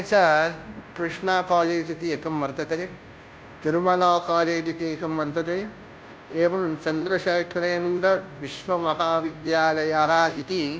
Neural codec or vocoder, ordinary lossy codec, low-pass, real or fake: codec, 16 kHz, 0.5 kbps, FunCodec, trained on Chinese and English, 25 frames a second; none; none; fake